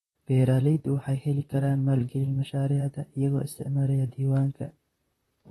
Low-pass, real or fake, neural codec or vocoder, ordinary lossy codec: 19.8 kHz; fake; vocoder, 44.1 kHz, 128 mel bands, Pupu-Vocoder; AAC, 32 kbps